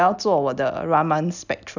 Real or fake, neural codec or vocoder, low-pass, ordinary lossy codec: real; none; 7.2 kHz; none